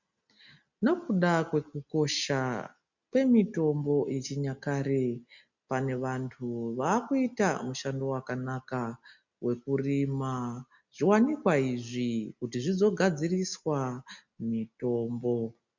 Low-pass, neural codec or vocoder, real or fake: 7.2 kHz; none; real